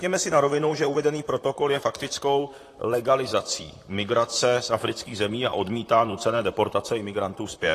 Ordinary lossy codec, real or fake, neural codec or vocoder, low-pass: AAC, 48 kbps; fake; vocoder, 44.1 kHz, 128 mel bands, Pupu-Vocoder; 14.4 kHz